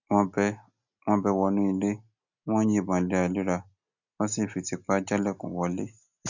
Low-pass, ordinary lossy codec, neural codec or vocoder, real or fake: 7.2 kHz; none; none; real